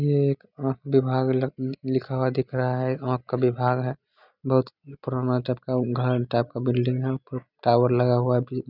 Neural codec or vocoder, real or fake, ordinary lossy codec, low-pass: none; real; none; 5.4 kHz